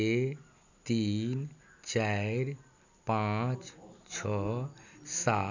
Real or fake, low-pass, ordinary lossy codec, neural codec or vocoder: real; 7.2 kHz; none; none